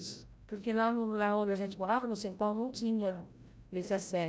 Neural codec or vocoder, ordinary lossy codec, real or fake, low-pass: codec, 16 kHz, 0.5 kbps, FreqCodec, larger model; none; fake; none